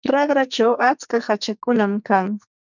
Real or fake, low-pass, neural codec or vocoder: fake; 7.2 kHz; codec, 44.1 kHz, 2.6 kbps, SNAC